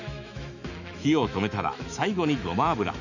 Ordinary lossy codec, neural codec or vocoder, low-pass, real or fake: none; autoencoder, 48 kHz, 128 numbers a frame, DAC-VAE, trained on Japanese speech; 7.2 kHz; fake